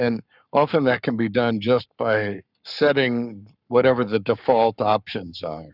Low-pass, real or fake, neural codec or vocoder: 5.4 kHz; fake; codec, 44.1 kHz, 7.8 kbps, Pupu-Codec